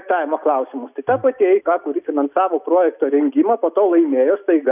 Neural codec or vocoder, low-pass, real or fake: none; 3.6 kHz; real